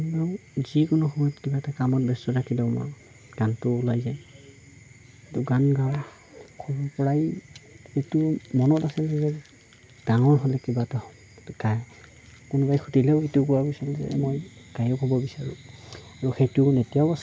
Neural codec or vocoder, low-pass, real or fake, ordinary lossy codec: none; none; real; none